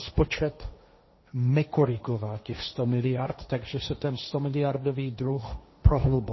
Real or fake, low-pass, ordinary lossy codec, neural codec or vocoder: fake; 7.2 kHz; MP3, 24 kbps; codec, 16 kHz, 1.1 kbps, Voila-Tokenizer